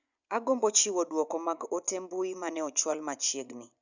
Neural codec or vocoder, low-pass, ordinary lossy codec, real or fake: vocoder, 24 kHz, 100 mel bands, Vocos; 7.2 kHz; none; fake